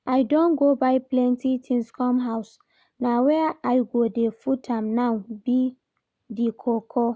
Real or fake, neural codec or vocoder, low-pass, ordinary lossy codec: real; none; none; none